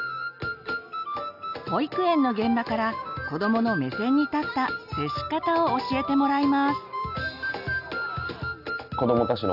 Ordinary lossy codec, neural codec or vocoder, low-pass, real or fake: none; none; 5.4 kHz; real